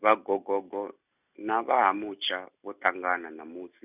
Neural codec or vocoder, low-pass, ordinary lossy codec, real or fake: none; 3.6 kHz; none; real